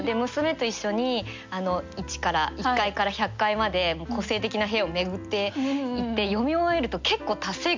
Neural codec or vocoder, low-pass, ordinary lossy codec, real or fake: none; 7.2 kHz; MP3, 64 kbps; real